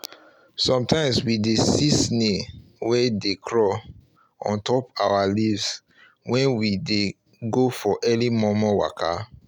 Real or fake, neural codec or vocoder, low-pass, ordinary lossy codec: real; none; 19.8 kHz; none